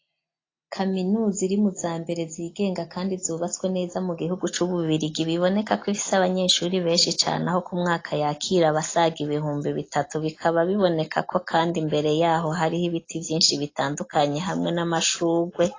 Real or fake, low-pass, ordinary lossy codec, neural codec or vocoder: real; 7.2 kHz; AAC, 32 kbps; none